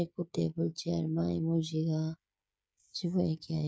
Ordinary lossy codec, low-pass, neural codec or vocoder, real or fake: none; none; codec, 16 kHz, 16 kbps, FreqCodec, smaller model; fake